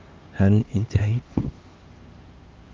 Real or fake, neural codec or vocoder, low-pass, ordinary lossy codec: fake; codec, 16 kHz, 2 kbps, X-Codec, HuBERT features, trained on LibriSpeech; 7.2 kHz; Opus, 24 kbps